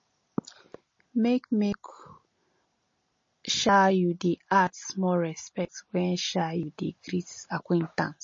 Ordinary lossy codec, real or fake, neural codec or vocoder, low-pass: MP3, 32 kbps; real; none; 7.2 kHz